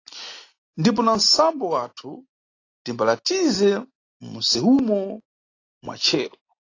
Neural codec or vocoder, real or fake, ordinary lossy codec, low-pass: none; real; AAC, 32 kbps; 7.2 kHz